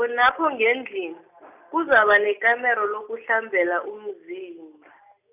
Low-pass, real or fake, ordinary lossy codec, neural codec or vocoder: 3.6 kHz; real; none; none